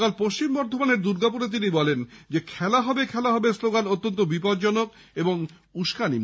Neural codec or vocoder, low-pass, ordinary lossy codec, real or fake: none; none; none; real